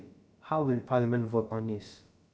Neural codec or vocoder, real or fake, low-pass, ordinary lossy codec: codec, 16 kHz, about 1 kbps, DyCAST, with the encoder's durations; fake; none; none